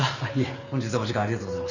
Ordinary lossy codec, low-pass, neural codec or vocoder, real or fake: none; 7.2 kHz; none; real